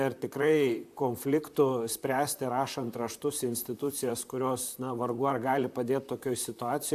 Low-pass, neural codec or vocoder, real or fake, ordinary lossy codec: 14.4 kHz; vocoder, 44.1 kHz, 128 mel bands, Pupu-Vocoder; fake; AAC, 96 kbps